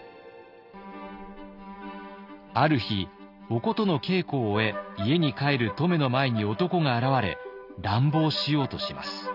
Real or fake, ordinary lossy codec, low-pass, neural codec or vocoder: real; none; 5.4 kHz; none